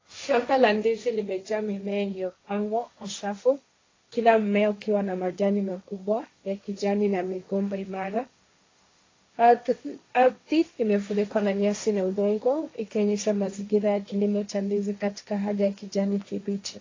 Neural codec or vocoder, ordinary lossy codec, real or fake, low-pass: codec, 16 kHz, 1.1 kbps, Voila-Tokenizer; AAC, 32 kbps; fake; 7.2 kHz